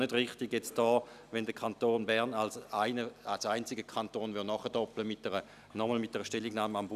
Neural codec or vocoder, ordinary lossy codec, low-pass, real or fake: none; none; 14.4 kHz; real